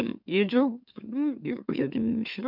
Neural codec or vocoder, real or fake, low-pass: autoencoder, 44.1 kHz, a latent of 192 numbers a frame, MeloTTS; fake; 5.4 kHz